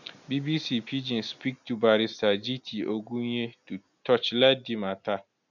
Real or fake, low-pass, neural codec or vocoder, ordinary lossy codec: real; 7.2 kHz; none; none